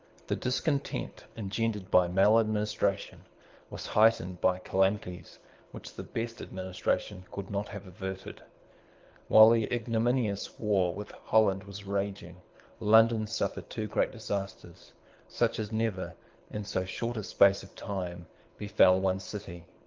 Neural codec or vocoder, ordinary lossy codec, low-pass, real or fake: codec, 24 kHz, 6 kbps, HILCodec; Opus, 32 kbps; 7.2 kHz; fake